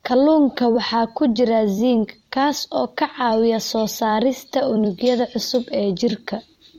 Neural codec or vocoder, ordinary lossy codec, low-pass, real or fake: vocoder, 44.1 kHz, 128 mel bands every 256 samples, BigVGAN v2; MP3, 64 kbps; 19.8 kHz; fake